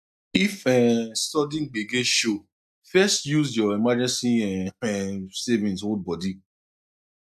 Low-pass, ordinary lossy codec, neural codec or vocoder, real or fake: 14.4 kHz; none; none; real